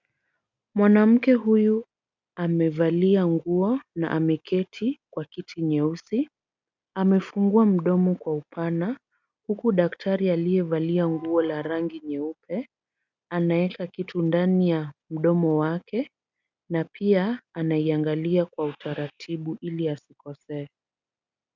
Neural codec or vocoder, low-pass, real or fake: none; 7.2 kHz; real